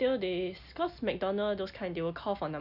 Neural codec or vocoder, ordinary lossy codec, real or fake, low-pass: none; none; real; 5.4 kHz